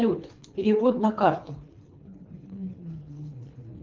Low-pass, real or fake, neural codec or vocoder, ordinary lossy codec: 7.2 kHz; fake; codec, 24 kHz, 3 kbps, HILCodec; Opus, 24 kbps